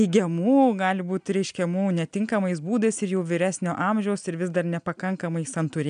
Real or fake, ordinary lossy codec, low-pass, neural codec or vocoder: real; MP3, 96 kbps; 9.9 kHz; none